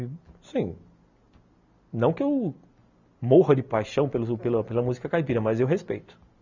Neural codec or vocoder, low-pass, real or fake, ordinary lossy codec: none; 7.2 kHz; real; none